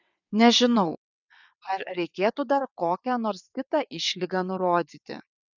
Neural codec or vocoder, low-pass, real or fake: vocoder, 22.05 kHz, 80 mel bands, WaveNeXt; 7.2 kHz; fake